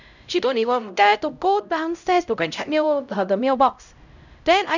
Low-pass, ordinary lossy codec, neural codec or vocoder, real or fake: 7.2 kHz; none; codec, 16 kHz, 0.5 kbps, X-Codec, HuBERT features, trained on LibriSpeech; fake